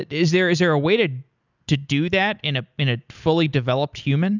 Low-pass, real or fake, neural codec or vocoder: 7.2 kHz; real; none